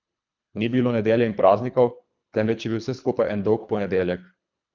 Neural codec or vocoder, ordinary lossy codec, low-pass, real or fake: codec, 24 kHz, 3 kbps, HILCodec; none; 7.2 kHz; fake